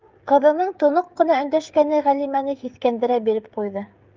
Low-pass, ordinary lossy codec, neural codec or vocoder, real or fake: 7.2 kHz; Opus, 32 kbps; codec, 16 kHz, 16 kbps, FreqCodec, smaller model; fake